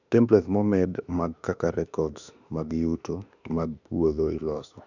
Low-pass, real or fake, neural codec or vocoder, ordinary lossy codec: 7.2 kHz; fake; autoencoder, 48 kHz, 32 numbers a frame, DAC-VAE, trained on Japanese speech; none